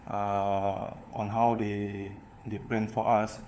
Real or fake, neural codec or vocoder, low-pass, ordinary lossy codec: fake; codec, 16 kHz, 4 kbps, FunCodec, trained on LibriTTS, 50 frames a second; none; none